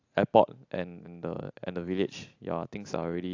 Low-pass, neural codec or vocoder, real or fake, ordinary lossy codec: 7.2 kHz; none; real; AAC, 48 kbps